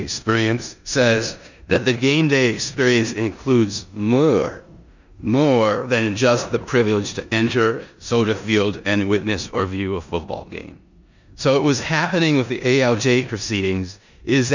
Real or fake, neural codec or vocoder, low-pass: fake; codec, 16 kHz in and 24 kHz out, 0.9 kbps, LongCat-Audio-Codec, four codebook decoder; 7.2 kHz